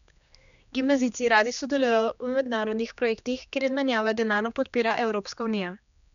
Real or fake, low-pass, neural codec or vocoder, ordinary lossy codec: fake; 7.2 kHz; codec, 16 kHz, 2 kbps, X-Codec, HuBERT features, trained on general audio; none